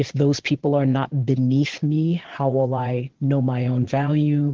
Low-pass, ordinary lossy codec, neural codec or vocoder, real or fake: 7.2 kHz; Opus, 16 kbps; vocoder, 22.05 kHz, 80 mel bands, Vocos; fake